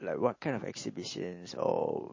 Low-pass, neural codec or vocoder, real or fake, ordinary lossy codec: 7.2 kHz; none; real; MP3, 32 kbps